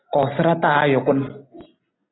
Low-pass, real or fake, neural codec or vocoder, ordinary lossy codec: 7.2 kHz; real; none; AAC, 16 kbps